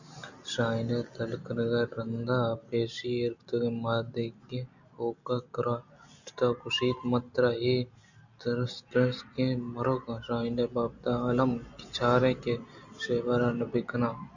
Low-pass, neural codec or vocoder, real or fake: 7.2 kHz; none; real